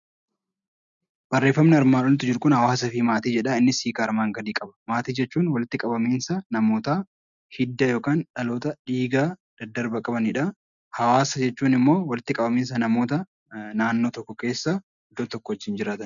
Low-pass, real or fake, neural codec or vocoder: 7.2 kHz; real; none